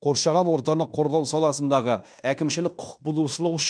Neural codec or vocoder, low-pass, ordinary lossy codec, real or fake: codec, 16 kHz in and 24 kHz out, 0.9 kbps, LongCat-Audio-Codec, fine tuned four codebook decoder; 9.9 kHz; none; fake